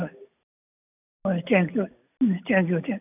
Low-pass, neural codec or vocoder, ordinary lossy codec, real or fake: 3.6 kHz; none; none; real